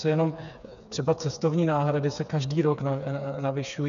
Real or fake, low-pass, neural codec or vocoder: fake; 7.2 kHz; codec, 16 kHz, 4 kbps, FreqCodec, smaller model